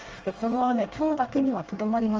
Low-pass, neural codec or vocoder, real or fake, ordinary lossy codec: 7.2 kHz; codec, 24 kHz, 1 kbps, SNAC; fake; Opus, 24 kbps